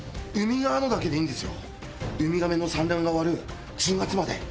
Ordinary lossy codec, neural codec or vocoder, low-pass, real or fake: none; none; none; real